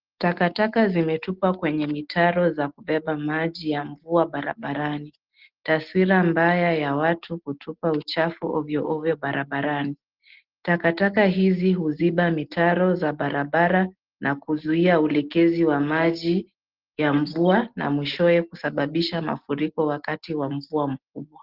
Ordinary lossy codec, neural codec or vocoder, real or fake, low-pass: Opus, 16 kbps; none; real; 5.4 kHz